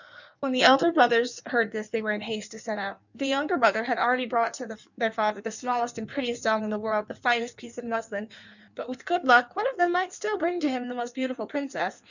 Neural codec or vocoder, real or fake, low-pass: codec, 16 kHz in and 24 kHz out, 1.1 kbps, FireRedTTS-2 codec; fake; 7.2 kHz